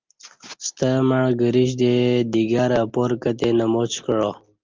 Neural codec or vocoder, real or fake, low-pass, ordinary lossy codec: none; real; 7.2 kHz; Opus, 24 kbps